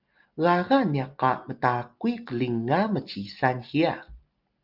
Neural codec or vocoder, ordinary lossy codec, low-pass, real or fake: none; Opus, 32 kbps; 5.4 kHz; real